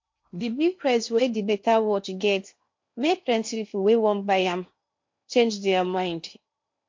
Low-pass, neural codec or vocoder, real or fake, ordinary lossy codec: 7.2 kHz; codec, 16 kHz in and 24 kHz out, 0.8 kbps, FocalCodec, streaming, 65536 codes; fake; MP3, 48 kbps